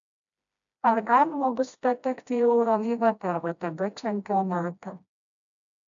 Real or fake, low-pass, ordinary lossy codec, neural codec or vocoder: fake; 7.2 kHz; MP3, 96 kbps; codec, 16 kHz, 1 kbps, FreqCodec, smaller model